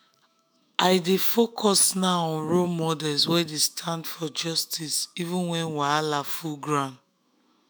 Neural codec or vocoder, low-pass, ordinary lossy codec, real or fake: autoencoder, 48 kHz, 128 numbers a frame, DAC-VAE, trained on Japanese speech; none; none; fake